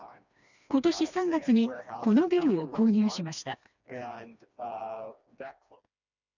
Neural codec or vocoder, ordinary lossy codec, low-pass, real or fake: codec, 16 kHz, 2 kbps, FreqCodec, smaller model; none; 7.2 kHz; fake